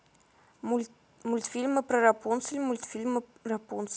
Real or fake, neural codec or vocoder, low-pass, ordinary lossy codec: real; none; none; none